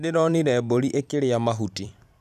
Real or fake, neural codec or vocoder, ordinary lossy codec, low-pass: fake; vocoder, 44.1 kHz, 128 mel bands every 256 samples, BigVGAN v2; none; 14.4 kHz